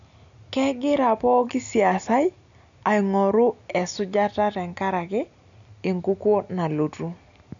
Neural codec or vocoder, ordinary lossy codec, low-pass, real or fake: none; none; 7.2 kHz; real